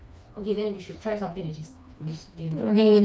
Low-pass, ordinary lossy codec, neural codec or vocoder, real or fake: none; none; codec, 16 kHz, 2 kbps, FreqCodec, smaller model; fake